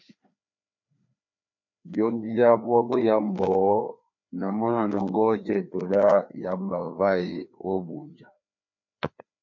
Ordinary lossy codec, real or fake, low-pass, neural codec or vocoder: MP3, 48 kbps; fake; 7.2 kHz; codec, 16 kHz, 2 kbps, FreqCodec, larger model